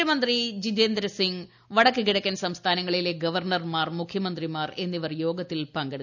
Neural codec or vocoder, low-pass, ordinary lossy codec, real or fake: none; 7.2 kHz; none; real